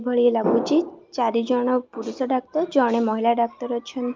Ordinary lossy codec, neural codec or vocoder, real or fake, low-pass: Opus, 24 kbps; none; real; 7.2 kHz